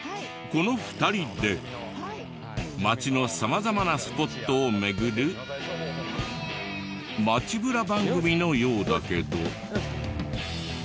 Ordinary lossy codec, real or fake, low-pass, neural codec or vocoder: none; real; none; none